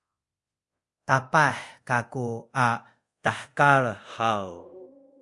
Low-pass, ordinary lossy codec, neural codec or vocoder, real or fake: 10.8 kHz; Opus, 64 kbps; codec, 24 kHz, 0.5 kbps, DualCodec; fake